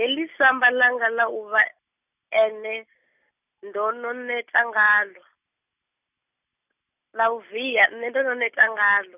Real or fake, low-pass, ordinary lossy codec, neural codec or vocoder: real; 3.6 kHz; none; none